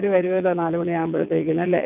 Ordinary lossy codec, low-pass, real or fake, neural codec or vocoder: none; 3.6 kHz; fake; vocoder, 22.05 kHz, 80 mel bands, WaveNeXt